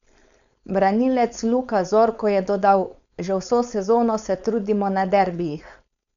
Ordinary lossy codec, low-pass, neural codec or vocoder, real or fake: none; 7.2 kHz; codec, 16 kHz, 4.8 kbps, FACodec; fake